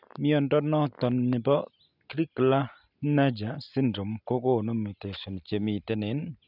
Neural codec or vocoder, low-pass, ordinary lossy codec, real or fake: none; 5.4 kHz; none; real